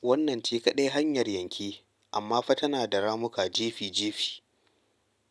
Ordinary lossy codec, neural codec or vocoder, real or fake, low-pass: none; none; real; none